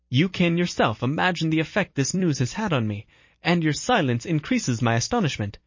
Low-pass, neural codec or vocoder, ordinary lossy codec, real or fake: 7.2 kHz; vocoder, 44.1 kHz, 128 mel bands every 256 samples, BigVGAN v2; MP3, 32 kbps; fake